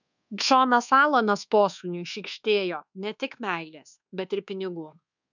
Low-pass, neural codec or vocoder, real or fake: 7.2 kHz; codec, 24 kHz, 1.2 kbps, DualCodec; fake